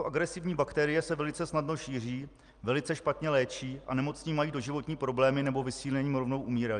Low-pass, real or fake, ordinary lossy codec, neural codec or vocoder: 9.9 kHz; real; Opus, 32 kbps; none